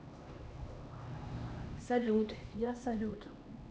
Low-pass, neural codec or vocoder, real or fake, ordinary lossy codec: none; codec, 16 kHz, 2 kbps, X-Codec, HuBERT features, trained on LibriSpeech; fake; none